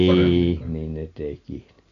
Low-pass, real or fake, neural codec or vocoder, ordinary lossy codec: 7.2 kHz; real; none; none